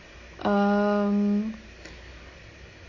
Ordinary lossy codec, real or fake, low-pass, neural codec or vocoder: MP3, 32 kbps; real; 7.2 kHz; none